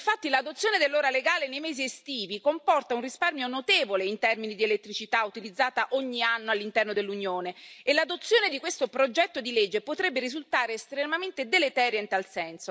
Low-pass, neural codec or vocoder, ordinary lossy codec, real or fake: none; none; none; real